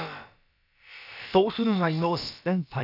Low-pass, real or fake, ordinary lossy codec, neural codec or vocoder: 5.4 kHz; fake; none; codec, 16 kHz, about 1 kbps, DyCAST, with the encoder's durations